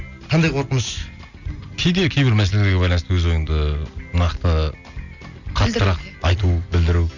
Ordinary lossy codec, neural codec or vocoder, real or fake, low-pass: none; none; real; 7.2 kHz